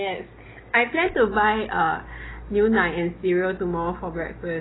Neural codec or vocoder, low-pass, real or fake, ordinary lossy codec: none; 7.2 kHz; real; AAC, 16 kbps